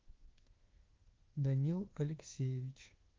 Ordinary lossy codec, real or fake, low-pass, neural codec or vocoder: Opus, 16 kbps; fake; 7.2 kHz; codec, 24 kHz, 1.2 kbps, DualCodec